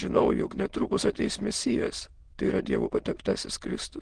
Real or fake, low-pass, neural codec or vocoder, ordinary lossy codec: fake; 9.9 kHz; autoencoder, 22.05 kHz, a latent of 192 numbers a frame, VITS, trained on many speakers; Opus, 16 kbps